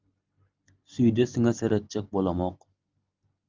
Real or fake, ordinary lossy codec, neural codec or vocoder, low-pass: real; Opus, 24 kbps; none; 7.2 kHz